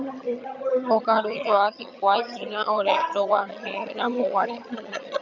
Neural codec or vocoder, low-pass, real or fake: vocoder, 22.05 kHz, 80 mel bands, HiFi-GAN; 7.2 kHz; fake